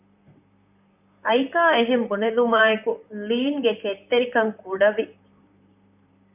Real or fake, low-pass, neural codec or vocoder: fake; 3.6 kHz; vocoder, 44.1 kHz, 128 mel bands, Pupu-Vocoder